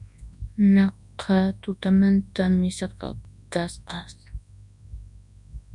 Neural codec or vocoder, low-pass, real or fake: codec, 24 kHz, 0.9 kbps, WavTokenizer, large speech release; 10.8 kHz; fake